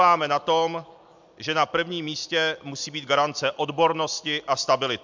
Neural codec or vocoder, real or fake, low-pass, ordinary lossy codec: none; real; 7.2 kHz; MP3, 64 kbps